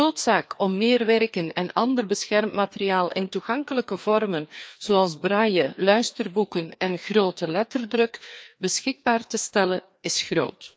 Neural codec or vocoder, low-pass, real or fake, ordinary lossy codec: codec, 16 kHz, 2 kbps, FreqCodec, larger model; none; fake; none